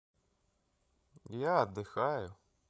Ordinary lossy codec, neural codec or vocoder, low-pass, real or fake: none; codec, 16 kHz, 16 kbps, FreqCodec, larger model; none; fake